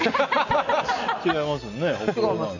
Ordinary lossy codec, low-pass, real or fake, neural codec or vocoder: none; 7.2 kHz; real; none